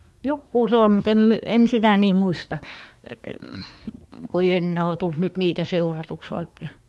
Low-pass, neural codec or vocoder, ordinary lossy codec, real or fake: none; codec, 24 kHz, 1 kbps, SNAC; none; fake